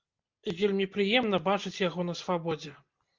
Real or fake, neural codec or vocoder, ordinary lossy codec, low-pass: real; none; Opus, 32 kbps; 7.2 kHz